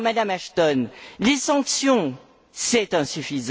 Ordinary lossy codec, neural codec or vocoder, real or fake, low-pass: none; none; real; none